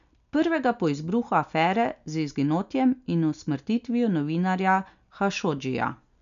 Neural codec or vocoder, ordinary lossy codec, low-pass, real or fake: none; none; 7.2 kHz; real